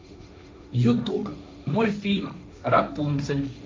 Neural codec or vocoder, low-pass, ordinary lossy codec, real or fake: codec, 16 kHz, 1.1 kbps, Voila-Tokenizer; none; none; fake